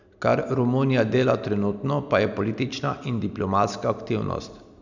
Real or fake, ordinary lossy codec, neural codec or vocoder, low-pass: real; none; none; 7.2 kHz